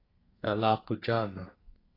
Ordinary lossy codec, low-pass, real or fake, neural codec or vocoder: AAC, 24 kbps; 5.4 kHz; fake; codec, 32 kHz, 1.9 kbps, SNAC